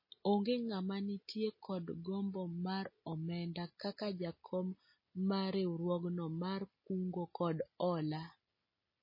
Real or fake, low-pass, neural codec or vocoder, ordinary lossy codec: real; 5.4 kHz; none; MP3, 24 kbps